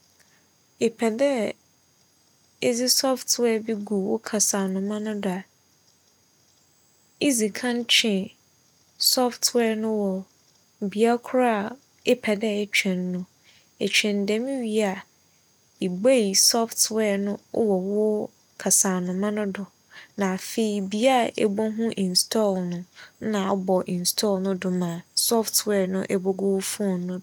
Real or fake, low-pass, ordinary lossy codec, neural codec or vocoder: real; 19.8 kHz; none; none